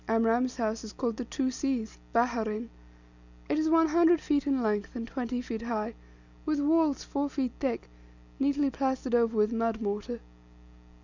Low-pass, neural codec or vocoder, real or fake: 7.2 kHz; none; real